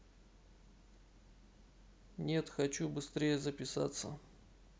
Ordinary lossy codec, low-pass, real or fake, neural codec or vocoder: none; none; real; none